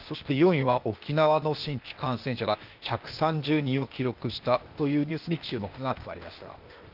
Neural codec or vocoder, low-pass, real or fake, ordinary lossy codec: codec, 16 kHz, 0.8 kbps, ZipCodec; 5.4 kHz; fake; Opus, 32 kbps